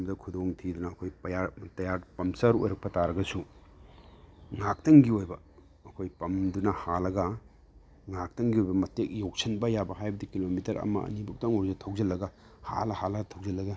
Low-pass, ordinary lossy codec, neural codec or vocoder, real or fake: none; none; none; real